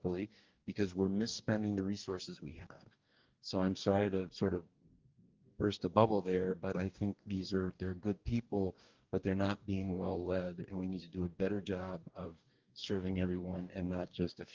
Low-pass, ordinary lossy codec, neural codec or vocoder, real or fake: 7.2 kHz; Opus, 32 kbps; codec, 44.1 kHz, 2.6 kbps, DAC; fake